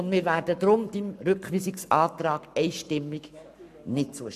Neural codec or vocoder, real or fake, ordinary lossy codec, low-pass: codec, 44.1 kHz, 7.8 kbps, Pupu-Codec; fake; none; 14.4 kHz